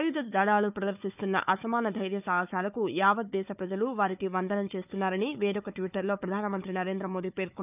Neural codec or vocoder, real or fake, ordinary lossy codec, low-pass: codec, 16 kHz, 4 kbps, FunCodec, trained on Chinese and English, 50 frames a second; fake; none; 3.6 kHz